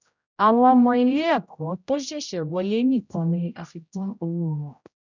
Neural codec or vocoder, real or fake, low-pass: codec, 16 kHz, 0.5 kbps, X-Codec, HuBERT features, trained on general audio; fake; 7.2 kHz